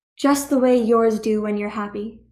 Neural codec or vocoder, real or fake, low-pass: codec, 44.1 kHz, 7.8 kbps, DAC; fake; 14.4 kHz